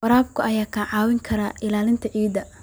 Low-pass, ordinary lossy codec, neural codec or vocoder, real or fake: none; none; none; real